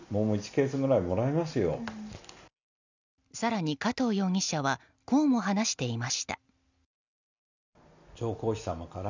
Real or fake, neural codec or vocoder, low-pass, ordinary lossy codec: real; none; 7.2 kHz; none